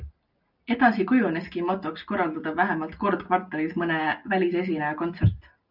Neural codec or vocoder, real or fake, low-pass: none; real; 5.4 kHz